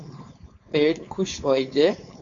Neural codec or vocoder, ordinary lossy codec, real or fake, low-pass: codec, 16 kHz, 4.8 kbps, FACodec; AAC, 48 kbps; fake; 7.2 kHz